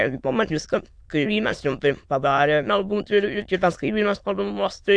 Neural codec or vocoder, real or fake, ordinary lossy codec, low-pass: autoencoder, 22.05 kHz, a latent of 192 numbers a frame, VITS, trained on many speakers; fake; AAC, 64 kbps; 9.9 kHz